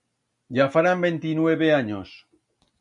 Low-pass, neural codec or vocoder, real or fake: 10.8 kHz; none; real